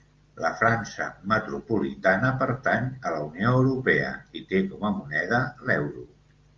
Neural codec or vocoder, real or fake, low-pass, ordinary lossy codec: none; real; 7.2 kHz; Opus, 24 kbps